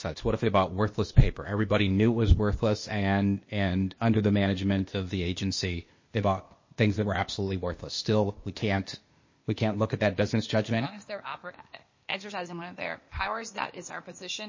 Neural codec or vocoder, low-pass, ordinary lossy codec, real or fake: codec, 16 kHz, 0.8 kbps, ZipCodec; 7.2 kHz; MP3, 32 kbps; fake